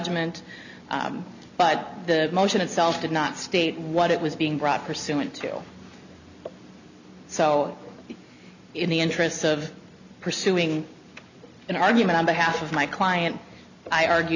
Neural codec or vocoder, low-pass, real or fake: none; 7.2 kHz; real